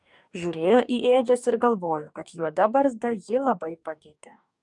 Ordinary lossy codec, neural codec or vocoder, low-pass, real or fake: MP3, 96 kbps; codec, 44.1 kHz, 2.6 kbps, DAC; 10.8 kHz; fake